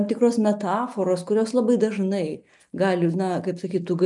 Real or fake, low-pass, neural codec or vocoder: real; 10.8 kHz; none